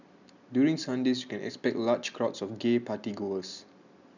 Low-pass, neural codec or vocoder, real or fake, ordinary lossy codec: 7.2 kHz; none; real; none